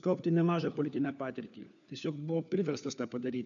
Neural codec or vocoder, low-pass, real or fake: codec, 16 kHz, 4 kbps, FunCodec, trained on LibriTTS, 50 frames a second; 7.2 kHz; fake